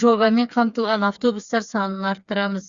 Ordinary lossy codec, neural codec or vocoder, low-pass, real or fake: Opus, 64 kbps; codec, 16 kHz, 4 kbps, FreqCodec, smaller model; 7.2 kHz; fake